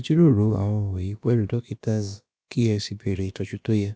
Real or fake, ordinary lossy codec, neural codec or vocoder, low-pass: fake; none; codec, 16 kHz, about 1 kbps, DyCAST, with the encoder's durations; none